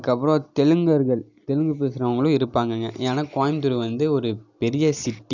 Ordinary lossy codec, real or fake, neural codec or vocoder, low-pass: none; real; none; 7.2 kHz